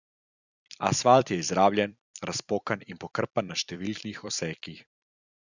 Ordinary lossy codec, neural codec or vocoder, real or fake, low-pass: none; vocoder, 44.1 kHz, 128 mel bands every 256 samples, BigVGAN v2; fake; 7.2 kHz